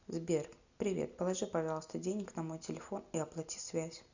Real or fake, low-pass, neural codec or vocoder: real; 7.2 kHz; none